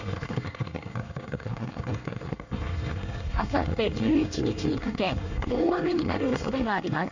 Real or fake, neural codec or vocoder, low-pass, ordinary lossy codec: fake; codec, 24 kHz, 1 kbps, SNAC; 7.2 kHz; none